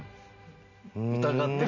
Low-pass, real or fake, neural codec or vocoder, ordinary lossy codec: 7.2 kHz; real; none; none